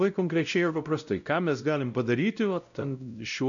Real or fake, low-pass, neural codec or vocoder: fake; 7.2 kHz; codec, 16 kHz, 0.5 kbps, X-Codec, WavLM features, trained on Multilingual LibriSpeech